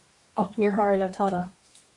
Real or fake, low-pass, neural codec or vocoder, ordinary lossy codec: fake; 10.8 kHz; codec, 24 kHz, 1 kbps, SNAC; MP3, 96 kbps